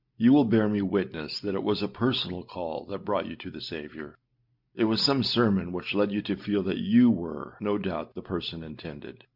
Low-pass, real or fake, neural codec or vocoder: 5.4 kHz; real; none